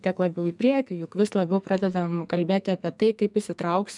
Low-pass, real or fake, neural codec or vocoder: 10.8 kHz; fake; codec, 44.1 kHz, 2.6 kbps, SNAC